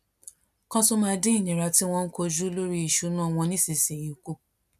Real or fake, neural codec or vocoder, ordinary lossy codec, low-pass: real; none; none; 14.4 kHz